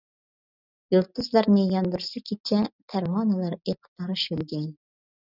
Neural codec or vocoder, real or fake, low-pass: none; real; 5.4 kHz